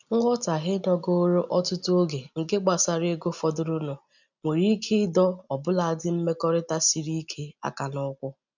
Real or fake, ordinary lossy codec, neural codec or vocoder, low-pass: real; none; none; 7.2 kHz